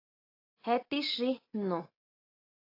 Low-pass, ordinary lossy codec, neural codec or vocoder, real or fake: 5.4 kHz; AAC, 32 kbps; none; real